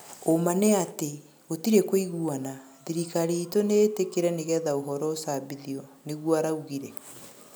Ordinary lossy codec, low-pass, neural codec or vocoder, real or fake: none; none; none; real